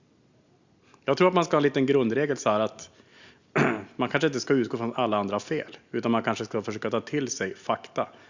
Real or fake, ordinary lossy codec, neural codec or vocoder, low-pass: real; Opus, 64 kbps; none; 7.2 kHz